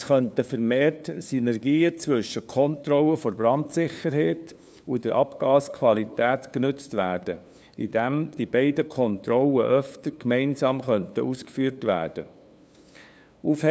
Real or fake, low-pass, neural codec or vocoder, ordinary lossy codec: fake; none; codec, 16 kHz, 2 kbps, FunCodec, trained on LibriTTS, 25 frames a second; none